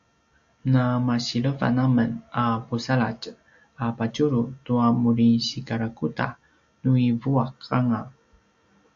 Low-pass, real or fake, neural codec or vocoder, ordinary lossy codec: 7.2 kHz; real; none; AAC, 64 kbps